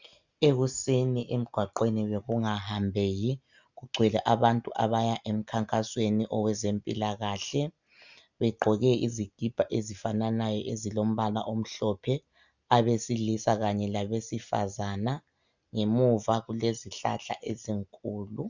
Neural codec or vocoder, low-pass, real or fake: none; 7.2 kHz; real